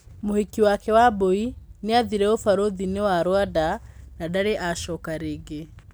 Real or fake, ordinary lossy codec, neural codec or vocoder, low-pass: real; none; none; none